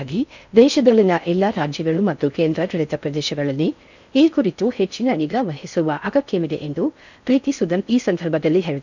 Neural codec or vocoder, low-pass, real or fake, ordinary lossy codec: codec, 16 kHz in and 24 kHz out, 0.6 kbps, FocalCodec, streaming, 4096 codes; 7.2 kHz; fake; none